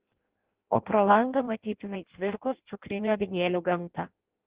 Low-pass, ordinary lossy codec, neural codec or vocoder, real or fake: 3.6 kHz; Opus, 16 kbps; codec, 16 kHz in and 24 kHz out, 0.6 kbps, FireRedTTS-2 codec; fake